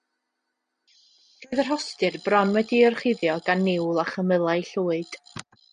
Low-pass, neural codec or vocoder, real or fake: 9.9 kHz; none; real